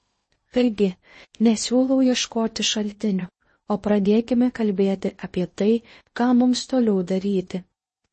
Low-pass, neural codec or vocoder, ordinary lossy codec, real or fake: 10.8 kHz; codec, 16 kHz in and 24 kHz out, 0.6 kbps, FocalCodec, streaming, 2048 codes; MP3, 32 kbps; fake